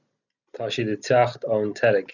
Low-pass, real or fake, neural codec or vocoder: 7.2 kHz; real; none